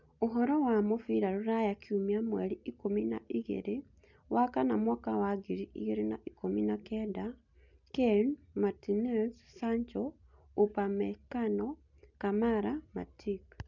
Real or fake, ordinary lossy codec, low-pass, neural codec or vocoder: real; none; 7.2 kHz; none